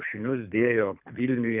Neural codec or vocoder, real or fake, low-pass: codec, 24 kHz, 3 kbps, HILCodec; fake; 3.6 kHz